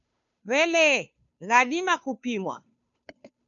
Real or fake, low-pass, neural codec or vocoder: fake; 7.2 kHz; codec, 16 kHz, 2 kbps, FunCodec, trained on Chinese and English, 25 frames a second